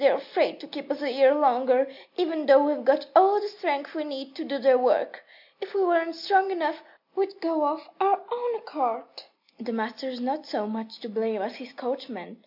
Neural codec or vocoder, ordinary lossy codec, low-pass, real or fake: none; MP3, 48 kbps; 5.4 kHz; real